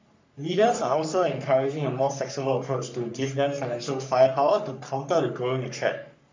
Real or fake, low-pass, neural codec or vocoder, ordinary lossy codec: fake; 7.2 kHz; codec, 44.1 kHz, 3.4 kbps, Pupu-Codec; MP3, 64 kbps